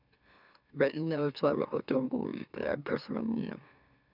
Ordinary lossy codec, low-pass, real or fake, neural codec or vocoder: none; 5.4 kHz; fake; autoencoder, 44.1 kHz, a latent of 192 numbers a frame, MeloTTS